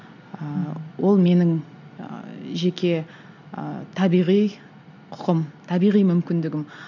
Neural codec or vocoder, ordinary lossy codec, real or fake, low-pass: none; none; real; 7.2 kHz